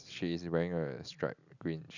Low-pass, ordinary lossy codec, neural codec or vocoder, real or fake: 7.2 kHz; none; none; real